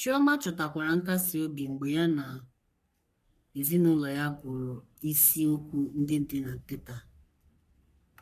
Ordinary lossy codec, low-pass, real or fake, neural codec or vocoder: none; 14.4 kHz; fake; codec, 44.1 kHz, 3.4 kbps, Pupu-Codec